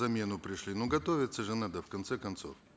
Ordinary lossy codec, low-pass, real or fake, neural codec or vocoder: none; none; real; none